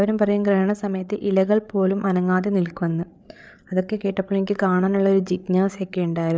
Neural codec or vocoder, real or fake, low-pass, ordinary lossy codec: codec, 16 kHz, 8 kbps, FreqCodec, larger model; fake; none; none